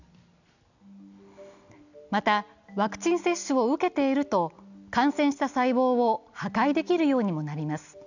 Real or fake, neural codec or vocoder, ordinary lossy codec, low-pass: real; none; none; 7.2 kHz